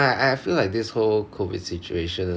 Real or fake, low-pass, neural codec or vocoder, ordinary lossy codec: real; none; none; none